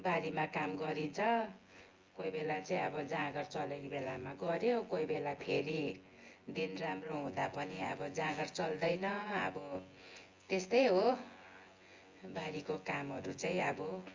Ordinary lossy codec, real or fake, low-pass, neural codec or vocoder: Opus, 32 kbps; fake; 7.2 kHz; vocoder, 24 kHz, 100 mel bands, Vocos